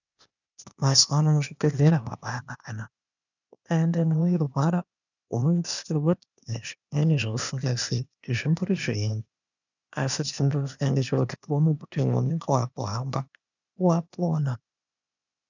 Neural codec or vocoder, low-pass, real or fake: codec, 16 kHz, 0.8 kbps, ZipCodec; 7.2 kHz; fake